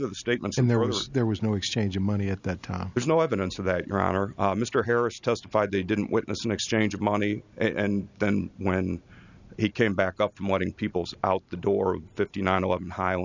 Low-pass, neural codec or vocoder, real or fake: 7.2 kHz; none; real